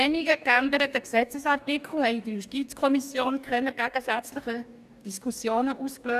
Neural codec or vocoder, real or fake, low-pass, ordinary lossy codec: codec, 44.1 kHz, 2.6 kbps, DAC; fake; 14.4 kHz; none